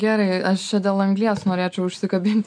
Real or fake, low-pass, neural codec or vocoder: real; 9.9 kHz; none